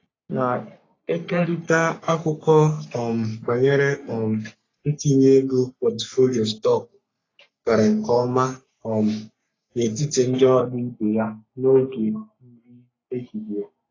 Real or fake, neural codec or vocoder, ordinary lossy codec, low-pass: fake; codec, 44.1 kHz, 3.4 kbps, Pupu-Codec; AAC, 32 kbps; 7.2 kHz